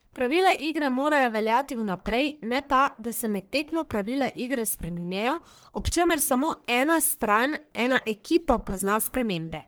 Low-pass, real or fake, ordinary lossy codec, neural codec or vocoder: none; fake; none; codec, 44.1 kHz, 1.7 kbps, Pupu-Codec